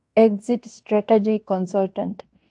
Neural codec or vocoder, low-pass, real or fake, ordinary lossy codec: codec, 16 kHz in and 24 kHz out, 0.9 kbps, LongCat-Audio-Codec, fine tuned four codebook decoder; 10.8 kHz; fake; AAC, 64 kbps